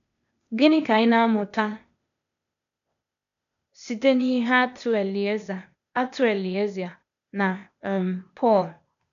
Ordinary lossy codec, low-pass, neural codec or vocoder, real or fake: none; 7.2 kHz; codec, 16 kHz, 0.8 kbps, ZipCodec; fake